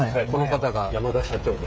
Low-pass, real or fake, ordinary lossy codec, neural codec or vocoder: none; fake; none; codec, 16 kHz, 16 kbps, FreqCodec, smaller model